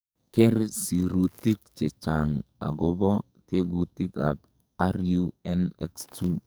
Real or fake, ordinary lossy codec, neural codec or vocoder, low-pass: fake; none; codec, 44.1 kHz, 2.6 kbps, SNAC; none